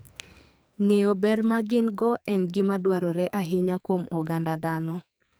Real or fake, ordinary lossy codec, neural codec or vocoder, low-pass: fake; none; codec, 44.1 kHz, 2.6 kbps, SNAC; none